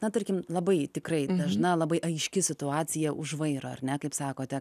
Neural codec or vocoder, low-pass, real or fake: none; 14.4 kHz; real